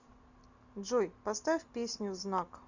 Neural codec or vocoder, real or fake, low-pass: none; real; 7.2 kHz